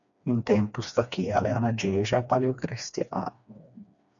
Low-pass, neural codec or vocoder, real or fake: 7.2 kHz; codec, 16 kHz, 2 kbps, FreqCodec, smaller model; fake